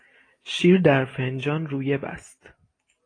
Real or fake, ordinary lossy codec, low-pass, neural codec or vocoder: real; AAC, 32 kbps; 9.9 kHz; none